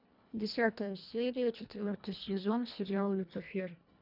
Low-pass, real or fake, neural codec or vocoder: 5.4 kHz; fake; codec, 24 kHz, 1.5 kbps, HILCodec